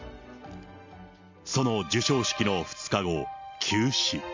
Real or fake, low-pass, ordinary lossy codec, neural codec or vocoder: real; 7.2 kHz; MP3, 48 kbps; none